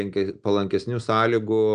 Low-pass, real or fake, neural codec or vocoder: 9.9 kHz; real; none